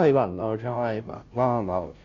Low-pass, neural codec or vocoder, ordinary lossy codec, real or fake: 7.2 kHz; codec, 16 kHz, 0.5 kbps, FunCodec, trained on Chinese and English, 25 frames a second; none; fake